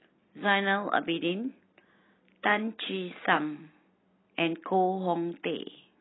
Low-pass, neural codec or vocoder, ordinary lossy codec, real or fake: 7.2 kHz; none; AAC, 16 kbps; real